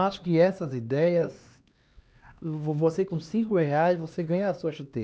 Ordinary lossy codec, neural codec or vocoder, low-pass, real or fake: none; codec, 16 kHz, 2 kbps, X-Codec, HuBERT features, trained on LibriSpeech; none; fake